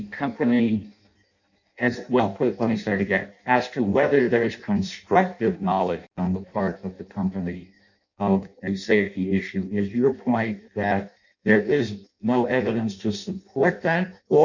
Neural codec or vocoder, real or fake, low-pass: codec, 16 kHz in and 24 kHz out, 0.6 kbps, FireRedTTS-2 codec; fake; 7.2 kHz